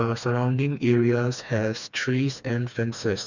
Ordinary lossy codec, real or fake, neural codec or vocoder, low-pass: none; fake; codec, 16 kHz, 2 kbps, FreqCodec, smaller model; 7.2 kHz